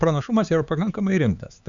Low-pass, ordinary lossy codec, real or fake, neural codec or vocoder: 7.2 kHz; Opus, 64 kbps; fake; codec, 16 kHz, 4 kbps, X-Codec, HuBERT features, trained on LibriSpeech